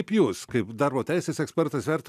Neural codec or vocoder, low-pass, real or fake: none; 14.4 kHz; real